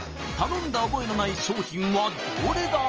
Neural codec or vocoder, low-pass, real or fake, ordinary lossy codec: none; 7.2 kHz; real; Opus, 24 kbps